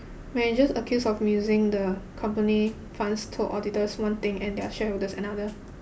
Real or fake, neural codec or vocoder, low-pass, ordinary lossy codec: real; none; none; none